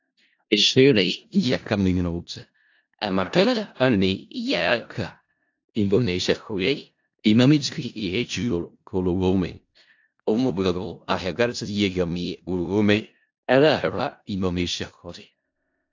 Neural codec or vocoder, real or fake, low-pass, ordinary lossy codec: codec, 16 kHz in and 24 kHz out, 0.4 kbps, LongCat-Audio-Codec, four codebook decoder; fake; 7.2 kHz; AAC, 48 kbps